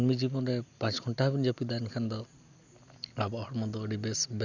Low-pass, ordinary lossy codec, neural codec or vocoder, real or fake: 7.2 kHz; none; none; real